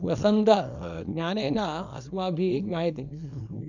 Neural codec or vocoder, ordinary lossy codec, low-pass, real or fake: codec, 24 kHz, 0.9 kbps, WavTokenizer, small release; none; 7.2 kHz; fake